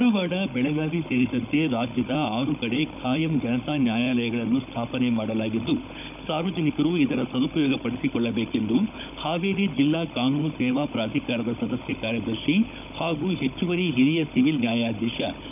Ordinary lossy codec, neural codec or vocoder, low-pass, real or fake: none; codec, 16 kHz, 16 kbps, FunCodec, trained on Chinese and English, 50 frames a second; 3.6 kHz; fake